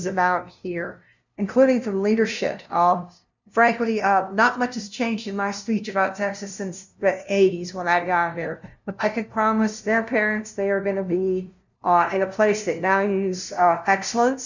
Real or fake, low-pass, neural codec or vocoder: fake; 7.2 kHz; codec, 16 kHz, 0.5 kbps, FunCodec, trained on LibriTTS, 25 frames a second